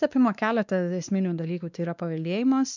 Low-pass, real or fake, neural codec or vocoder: 7.2 kHz; fake; codec, 16 kHz, 2 kbps, X-Codec, WavLM features, trained on Multilingual LibriSpeech